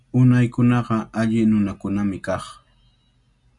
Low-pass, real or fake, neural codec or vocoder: 10.8 kHz; real; none